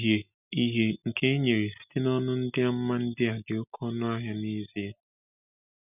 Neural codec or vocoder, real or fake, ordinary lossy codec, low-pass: none; real; none; 3.6 kHz